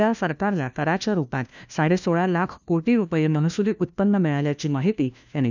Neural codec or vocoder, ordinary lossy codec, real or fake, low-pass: codec, 16 kHz, 1 kbps, FunCodec, trained on LibriTTS, 50 frames a second; none; fake; 7.2 kHz